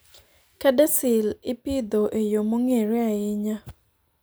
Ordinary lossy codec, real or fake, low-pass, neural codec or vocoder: none; real; none; none